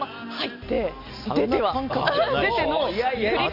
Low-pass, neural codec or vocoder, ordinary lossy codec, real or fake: 5.4 kHz; none; none; real